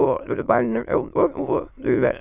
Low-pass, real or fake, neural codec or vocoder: 3.6 kHz; fake; autoencoder, 22.05 kHz, a latent of 192 numbers a frame, VITS, trained on many speakers